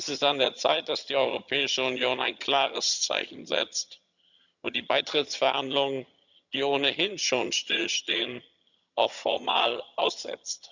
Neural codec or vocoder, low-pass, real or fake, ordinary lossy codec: vocoder, 22.05 kHz, 80 mel bands, HiFi-GAN; 7.2 kHz; fake; none